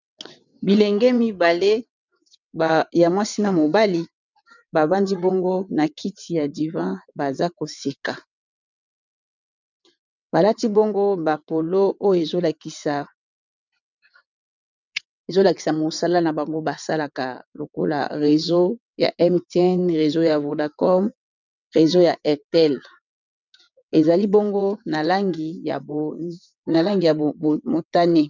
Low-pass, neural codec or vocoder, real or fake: 7.2 kHz; vocoder, 22.05 kHz, 80 mel bands, WaveNeXt; fake